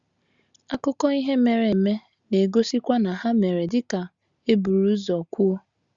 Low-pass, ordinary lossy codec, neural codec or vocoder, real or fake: 7.2 kHz; Opus, 64 kbps; none; real